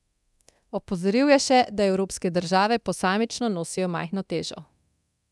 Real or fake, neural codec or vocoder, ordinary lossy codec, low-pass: fake; codec, 24 kHz, 0.9 kbps, DualCodec; none; none